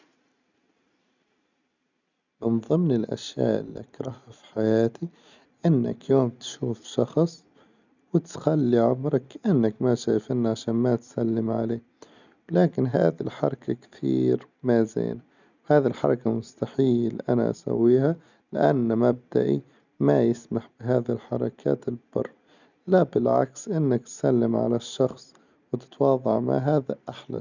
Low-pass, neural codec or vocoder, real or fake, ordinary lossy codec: 7.2 kHz; none; real; none